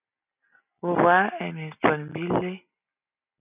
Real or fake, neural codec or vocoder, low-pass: real; none; 3.6 kHz